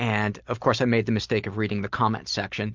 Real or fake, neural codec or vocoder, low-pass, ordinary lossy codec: real; none; 7.2 kHz; Opus, 32 kbps